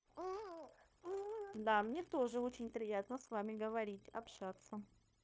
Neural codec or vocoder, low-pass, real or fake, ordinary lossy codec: codec, 16 kHz, 0.9 kbps, LongCat-Audio-Codec; none; fake; none